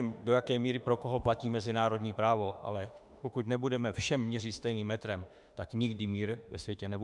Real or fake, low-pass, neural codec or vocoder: fake; 10.8 kHz; autoencoder, 48 kHz, 32 numbers a frame, DAC-VAE, trained on Japanese speech